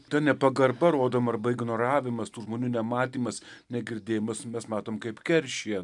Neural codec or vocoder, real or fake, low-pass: none; real; 10.8 kHz